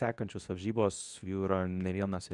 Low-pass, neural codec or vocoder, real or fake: 10.8 kHz; codec, 24 kHz, 0.9 kbps, WavTokenizer, medium speech release version 2; fake